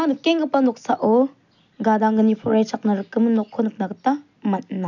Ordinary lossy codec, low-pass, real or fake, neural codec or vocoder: none; 7.2 kHz; real; none